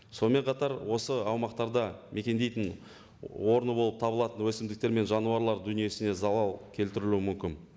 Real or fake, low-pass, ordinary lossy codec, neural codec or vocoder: real; none; none; none